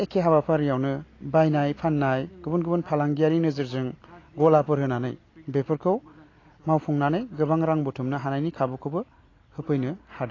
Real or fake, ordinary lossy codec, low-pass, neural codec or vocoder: real; AAC, 32 kbps; 7.2 kHz; none